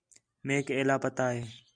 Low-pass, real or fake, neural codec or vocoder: 9.9 kHz; real; none